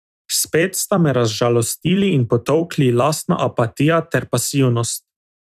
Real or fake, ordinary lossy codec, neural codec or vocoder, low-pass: fake; none; vocoder, 44.1 kHz, 128 mel bands every 256 samples, BigVGAN v2; 14.4 kHz